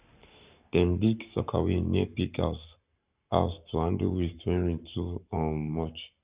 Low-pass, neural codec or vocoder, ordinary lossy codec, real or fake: 3.6 kHz; codec, 44.1 kHz, 7.8 kbps, Pupu-Codec; Opus, 64 kbps; fake